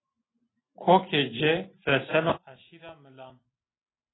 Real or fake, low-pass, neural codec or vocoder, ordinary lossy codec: real; 7.2 kHz; none; AAC, 16 kbps